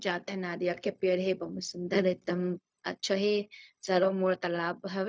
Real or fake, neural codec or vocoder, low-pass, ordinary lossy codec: fake; codec, 16 kHz, 0.4 kbps, LongCat-Audio-Codec; none; none